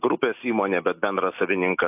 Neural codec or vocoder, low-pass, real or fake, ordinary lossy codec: none; 3.6 kHz; real; AAC, 32 kbps